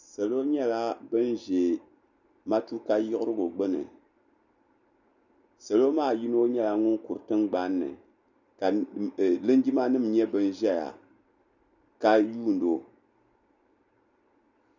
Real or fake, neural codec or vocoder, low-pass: real; none; 7.2 kHz